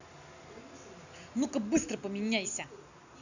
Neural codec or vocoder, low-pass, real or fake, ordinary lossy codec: none; 7.2 kHz; real; none